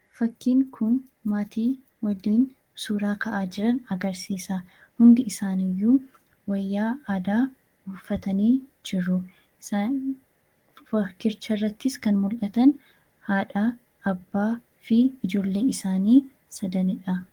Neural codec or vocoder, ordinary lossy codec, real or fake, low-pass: codec, 44.1 kHz, 7.8 kbps, Pupu-Codec; Opus, 24 kbps; fake; 19.8 kHz